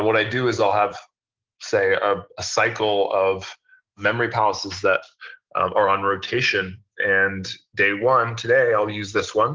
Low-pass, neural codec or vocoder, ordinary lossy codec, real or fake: 7.2 kHz; none; Opus, 16 kbps; real